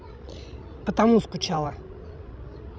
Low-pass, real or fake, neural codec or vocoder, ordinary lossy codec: none; fake; codec, 16 kHz, 16 kbps, FreqCodec, larger model; none